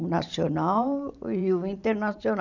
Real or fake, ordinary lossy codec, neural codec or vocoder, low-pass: fake; none; vocoder, 44.1 kHz, 128 mel bands every 512 samples, BigVGAN v2; 7.2 kHz